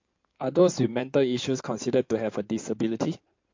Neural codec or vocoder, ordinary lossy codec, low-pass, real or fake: codec, 16 kHz in and 24 kHz out, 2.2 kbps, FireRedTTS-2 codec; MP3, 48 kbps; 7.2 kHz; fake